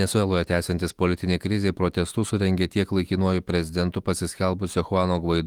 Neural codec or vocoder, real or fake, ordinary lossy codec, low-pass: autoencoder, 48 kHz, 128 numbers a frame, DAC-VAE, trained on Japanese speech; fake; Opus, 24 kbps; 19.8 kHz